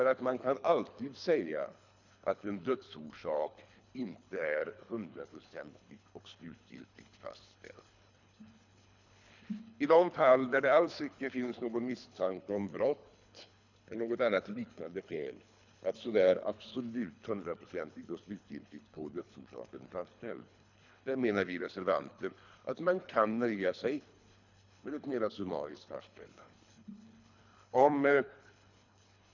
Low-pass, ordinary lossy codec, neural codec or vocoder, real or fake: 7.2 kHz; none; codec, 24 kHz, 3 kbps, HILCodec; fake